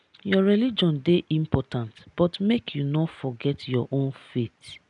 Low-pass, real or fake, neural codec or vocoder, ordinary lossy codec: 10.8 kHz; real; none; none